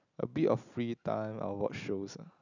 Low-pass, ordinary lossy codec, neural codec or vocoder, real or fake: 7.2 kHz; none; none; real